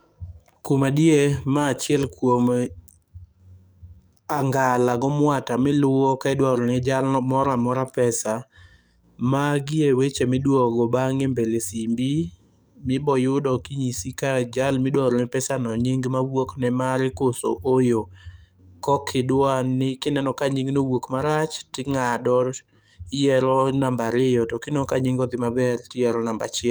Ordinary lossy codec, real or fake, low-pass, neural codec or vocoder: none; fake; none; codec, 44.1 kHz, 7.8 kbps, DAC